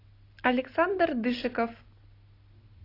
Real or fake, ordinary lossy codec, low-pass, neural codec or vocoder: real; AAC, 32 kbps; 5.4 kHz; none